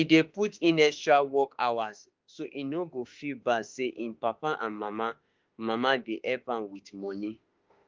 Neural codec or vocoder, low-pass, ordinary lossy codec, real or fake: autoencoder, 48 kHz, 32 numbers a frame, DAC-VAE, trained on Japanese speech; 7.2 kHz; Opus, 32 kbps; fake